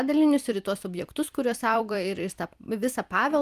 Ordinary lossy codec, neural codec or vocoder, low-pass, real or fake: Opus, 32 kbps; vocoder, 44.1 kHz, 128 mel bands every 256 samples, BigVGAN v2; 14.4 kHz; fake